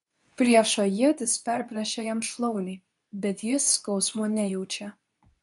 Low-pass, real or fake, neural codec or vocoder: 10.8 kHz; fake; codec, 24 kHz, 0.9 kbps, WavTokenizer, medium speech release version 2